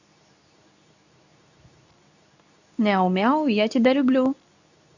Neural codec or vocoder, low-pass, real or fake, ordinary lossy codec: codec, 24 kHz, 0.9 kbps, WavTokenizer, medium speech release version 2; 7.2 kHz; fake; none